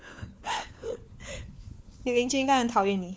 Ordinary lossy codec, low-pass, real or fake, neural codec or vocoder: none; none; fake; codec, 16 kHz, 4 kbps, FunCodec, trained on LibriTTS, 50 frames a second